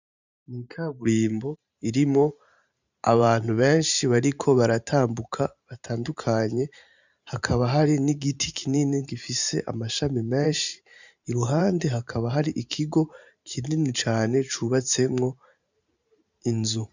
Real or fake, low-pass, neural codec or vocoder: fake; 7.2 kHz; vocoder, 44.1 kHz, 128 mel bands every 512 samples, BigVGAN v2